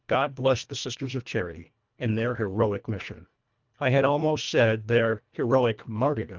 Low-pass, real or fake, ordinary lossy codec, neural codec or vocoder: 7.2 kHz; fake; Opus, 24 kbps; codec, 24 kHz, 1.5 kbps, HILCodec